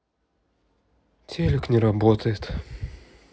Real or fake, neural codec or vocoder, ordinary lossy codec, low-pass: real; none; none; none